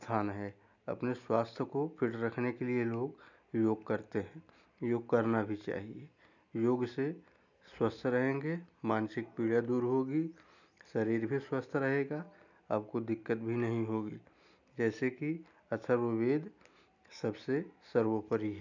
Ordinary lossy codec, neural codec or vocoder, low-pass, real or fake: none; none; 7.2 kHz; real